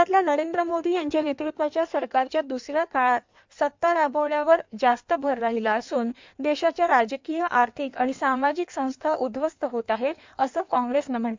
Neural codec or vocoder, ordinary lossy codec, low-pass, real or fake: codec, 16 kHz in and 24 kHz out, 1.1 kbps, FireRedTTS-2 codec; MP3, 64 kbps; 7.2 kHz; fake